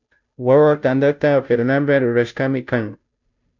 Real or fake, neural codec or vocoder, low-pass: fake; codec, 16 kHz, 0.5 kbps, FunCodec, trained on Chinese and English, 25 frames a second; 7.2 kHz